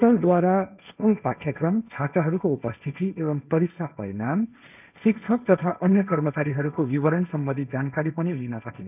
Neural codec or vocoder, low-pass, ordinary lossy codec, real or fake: codec, 16 kHz, 1.1 kbps, Voila-Tokenizer; 3.6 kHz; none; fake